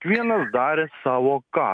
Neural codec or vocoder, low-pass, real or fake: none; 9.9 kHz; real